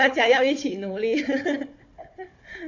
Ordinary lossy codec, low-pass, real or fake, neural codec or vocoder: AAC, 48 kbps; 7.2 kHz; fake; codec, 16 kHz, 4 kbps, FunCodec, trained on Chinese and English, 50 frames a second